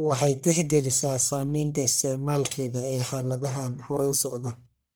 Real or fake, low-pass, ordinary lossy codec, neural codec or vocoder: fake; none; none; codec, 44.1 kHz, 1.7 kbps, Pupu-Codec